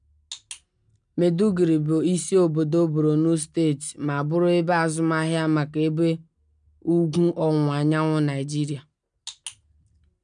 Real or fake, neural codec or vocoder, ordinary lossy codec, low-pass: real; none; none; 9.9 kHz